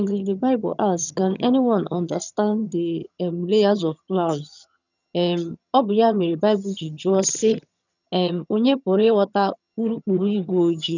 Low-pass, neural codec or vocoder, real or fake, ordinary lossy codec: 7.2 kHz; vocoder, 22.05 kHz, 80 mel bands, HiFi-GAN; fake; none